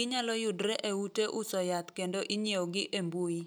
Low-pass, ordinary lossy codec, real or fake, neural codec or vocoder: none; none; real; none